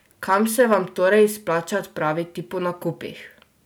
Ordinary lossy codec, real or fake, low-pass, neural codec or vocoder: none; real; none; none